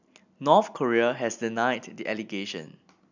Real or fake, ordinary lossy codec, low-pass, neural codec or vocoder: real; none; 7.2 kHz; none